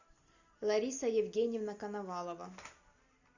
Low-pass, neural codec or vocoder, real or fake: 7.2 kHz; none; real